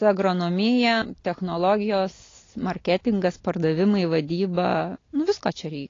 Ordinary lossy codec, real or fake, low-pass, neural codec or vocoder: AAC, 32 kbps; real; 7.2 kHz; none